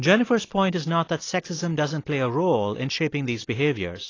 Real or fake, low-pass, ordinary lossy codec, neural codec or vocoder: real; 7.2 kHz; AAC, 32 kbps; none